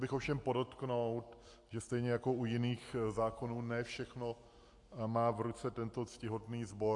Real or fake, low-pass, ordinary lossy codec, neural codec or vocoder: real; 10.8 kHz; AAC, 64 kbps; none